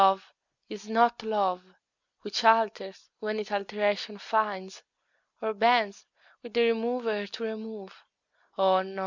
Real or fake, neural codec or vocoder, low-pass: real; none; 7.2 kHz